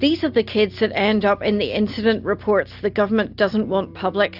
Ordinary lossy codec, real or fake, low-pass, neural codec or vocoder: AAC, 48 kbps; real; 5.4 kHz; none